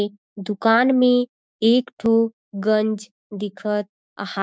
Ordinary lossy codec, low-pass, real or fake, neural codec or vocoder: none; none; fake; codec, 16 kHz, 6 kbps, DAC